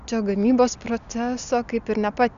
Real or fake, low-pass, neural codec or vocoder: real; 7.2 kHz; none